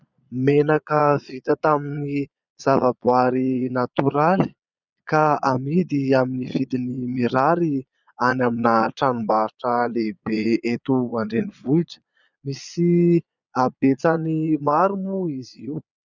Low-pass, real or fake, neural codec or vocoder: 7.2 kHz; fake; vocoder, 22.05 kHz, 80 mel bands, Vocos